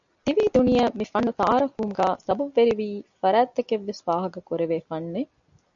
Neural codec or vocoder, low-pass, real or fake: none; 7.2 kHz; real